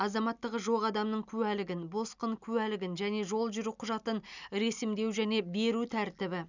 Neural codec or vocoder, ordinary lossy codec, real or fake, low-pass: none; none; real; 7.2 kHz